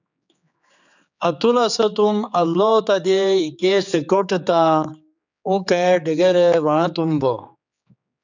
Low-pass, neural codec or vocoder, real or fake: 7.2 kHz; codec, 16 kHz, 4 kbps, X-Codec, HuBERT features, trained on general audio; fake